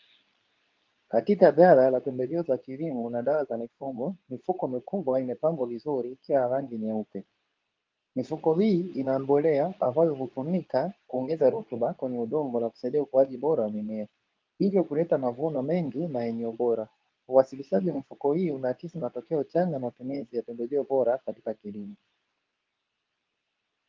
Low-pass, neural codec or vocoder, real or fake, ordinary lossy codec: 7.2 kHz; codec, 24 kHz, 0.9 kbps, WavTokenizer, medium speech release version 2; fake; Opus, 24 kbps